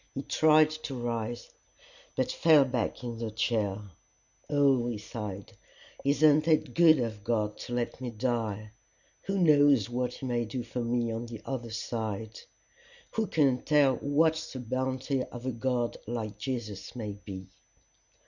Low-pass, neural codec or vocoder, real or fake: 7.2 kHz; none; real